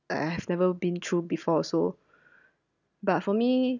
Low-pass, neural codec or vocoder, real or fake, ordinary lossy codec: 7.2 kHz; none; real; none